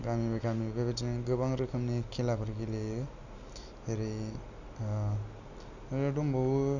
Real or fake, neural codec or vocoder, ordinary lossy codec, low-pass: real; none; none; 7.2 kHz